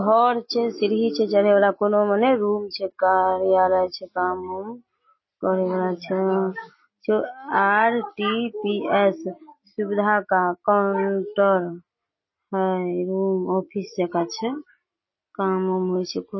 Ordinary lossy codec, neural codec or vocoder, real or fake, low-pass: MP3, 24 kbps; none; real; 7.2 kHz